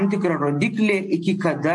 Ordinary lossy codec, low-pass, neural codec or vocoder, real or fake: MP3, 48 kbps; 10.8 kHz; none; real